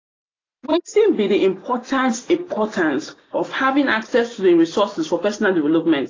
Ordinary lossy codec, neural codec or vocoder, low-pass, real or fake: AAC, 32 kbps; none; 7.2 kHz; real